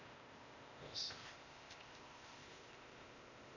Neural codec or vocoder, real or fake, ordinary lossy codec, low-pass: codec, 16 kHz, 0.8 kbps, ZipCodec; fake; none; 7.2 kHz